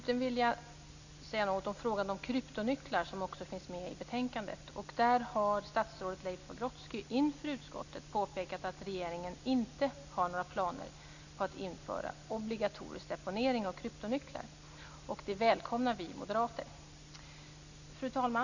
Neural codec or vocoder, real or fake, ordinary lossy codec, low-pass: none; real; none; 7.2 kHz